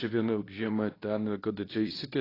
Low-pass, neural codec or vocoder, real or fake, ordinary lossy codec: 5.4 kHz; codec, 24 kHz, 0.9 kbps, WavTokenizer, medium speech release version 2; fake; AAC, 24 kbps